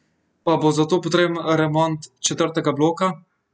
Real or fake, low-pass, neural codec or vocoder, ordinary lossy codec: real; none; none; none